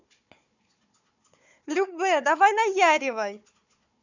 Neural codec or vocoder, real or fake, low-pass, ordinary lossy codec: codec, 16 kHz, 4 kbps, FunCodec, trained on Chinese and English, 50 frames a second; fake; 7.2 kHz; none